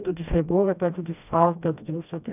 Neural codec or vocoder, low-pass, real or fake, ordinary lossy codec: codec, 16 kHz, 1 kbps, FreqCodec, smaller model; 3.6 kHz; fake; none